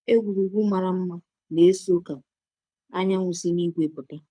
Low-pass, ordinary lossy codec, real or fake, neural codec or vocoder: 9.9 kHz; none; fake; codec, 24 kHz, 6 kbps, HILCodec